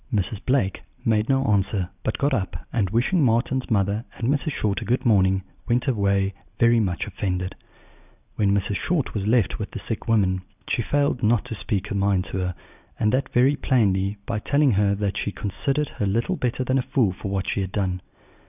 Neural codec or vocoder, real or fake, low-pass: none; real; 3.6 kHz